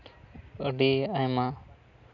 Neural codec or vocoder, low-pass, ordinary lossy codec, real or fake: none; 7.2 kHz; none; real